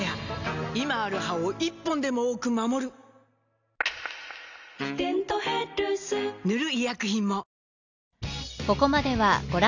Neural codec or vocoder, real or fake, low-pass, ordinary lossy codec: none; real; 7.2 kHz; none